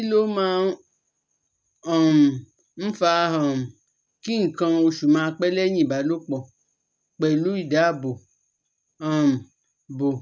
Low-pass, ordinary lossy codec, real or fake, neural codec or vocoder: none; none; real; none